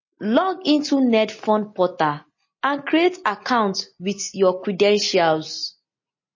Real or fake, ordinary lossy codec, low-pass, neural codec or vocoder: real; MP3, 32 kbps; 7.2 kHz; none